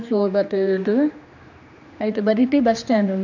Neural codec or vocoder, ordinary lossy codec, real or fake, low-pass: codec, 16 kHz, 2 kbps, X-Codec, HuBERT features, trained on general audio; none; fake; 7.2 kHz